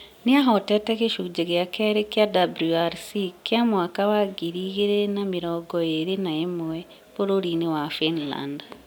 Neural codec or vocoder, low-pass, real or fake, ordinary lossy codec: none; none; real; none